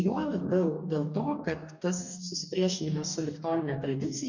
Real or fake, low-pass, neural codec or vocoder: fake; 7.2 kHz; codec, 44.1 kHz, 2.6 kbps, DAC